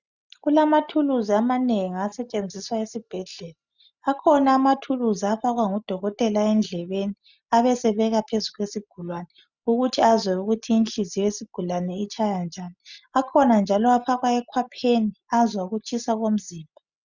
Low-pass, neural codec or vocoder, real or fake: 7.2 kHz; none; real